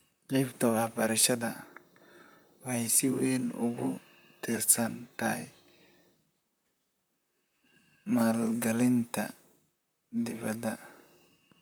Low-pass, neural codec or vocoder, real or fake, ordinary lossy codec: none; vocoder, 44.1 kHz, 128 mel bands, Pupu-Vocoder; fake; none